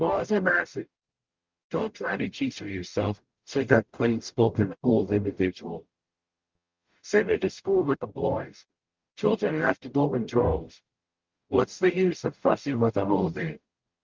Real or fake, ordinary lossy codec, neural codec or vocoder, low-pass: fake; Opus, 32 kbps; codec, 44.1 kHz, 0.9 kbps, DAC; 7.2 kHz